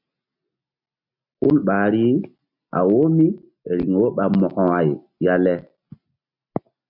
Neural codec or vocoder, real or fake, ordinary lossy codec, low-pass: none; real; MP3, 48 kbps; 5.4 kHz